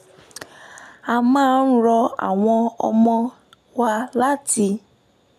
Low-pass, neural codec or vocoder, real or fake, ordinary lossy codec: 14.4 kHz; none; real; none